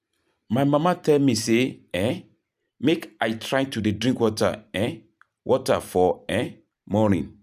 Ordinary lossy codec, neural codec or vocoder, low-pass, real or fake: none; vocoder, 44.1 kHz, 128 mel bands every 512 samples, BigVGAN v2; 14.4 kHz; fake